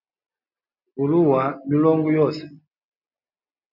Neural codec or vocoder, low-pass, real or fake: none; 5.4 kHz; real